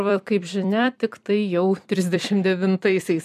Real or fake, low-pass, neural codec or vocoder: fake; 14.4 kHz; vocoder, 44.1 kHz, 128 mel bands every 256 samples, BigVGAN v2